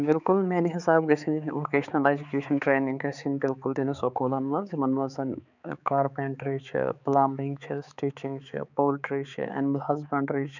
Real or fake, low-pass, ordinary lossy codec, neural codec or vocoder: fake; 7.2 kHz; none; codec, 16 kHz, 4 kbps, X-Codec, HuBERT features, trained on balanced general audio